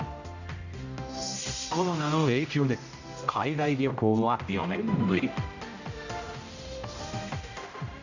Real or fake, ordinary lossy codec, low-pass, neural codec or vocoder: fake; none; 7.2 kHz; codec, 16 kHz, 0.5 kbps, X-Codec, HuBERT features, trained on general audio